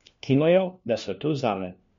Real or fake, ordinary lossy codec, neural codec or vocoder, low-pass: fake; MP3, 48 kbps; codec, 16 kHz, 1 kbps, FunCodec, trained on LibriTTS, 50 frames a second; 7.2 kHz